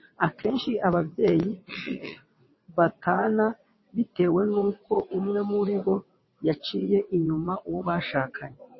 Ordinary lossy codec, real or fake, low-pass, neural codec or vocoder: MP3, 24 kbps; fake; 7.2 kHz; vocoder, 22.05 kHz, 80 mel bands, WaveNeXt